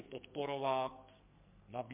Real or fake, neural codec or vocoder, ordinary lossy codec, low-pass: fake; codec, 44.1 kHz, 2.6 kbps, SNAC; MP3, 32 kbps; 3.6 kHz